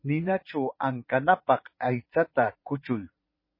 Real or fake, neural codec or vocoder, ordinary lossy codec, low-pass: fake; vocoder, 44.1 kHz, 80 mel bands, Vocos; MP3, 24 kbps; 5.4 kHz